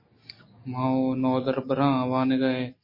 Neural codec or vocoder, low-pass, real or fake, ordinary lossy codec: none; 5.4 kHz; real; MP3, 24 kbps